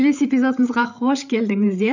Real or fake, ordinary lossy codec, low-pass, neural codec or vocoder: fake; none; 7.2 kHz; codec, 16 kHz, 8 kbps, FreqCodec, larger model